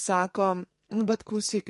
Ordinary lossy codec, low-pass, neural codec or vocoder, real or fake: MP3, 48 kbps; 14.4 kHz; codec, 44.1 kHz, 2.6 kbps, SNAC; fake